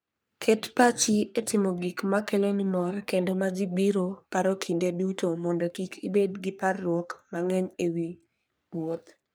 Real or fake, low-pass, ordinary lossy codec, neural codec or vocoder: fake; none; none; codec, 44.1 kHz, 3.4 kbps, Pupu-Codec